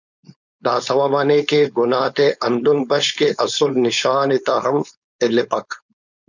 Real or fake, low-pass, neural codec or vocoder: fake; 7.2 kHz; codec, 16 kHz, 4.8 kbps, FACodec